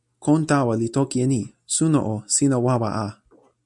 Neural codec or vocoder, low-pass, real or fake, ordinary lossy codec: none; 10.8 kHz; real; MP3, 96 kbps